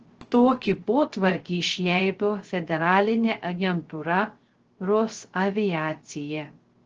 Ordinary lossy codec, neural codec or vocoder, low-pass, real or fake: Opus, 16 kbps; codec, 16 kHz, 0.3 kbps, FocalCodec; 7.2 kHz; fake